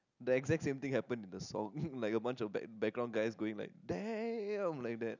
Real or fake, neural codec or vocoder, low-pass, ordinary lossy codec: real; none; 7.2 kHz; none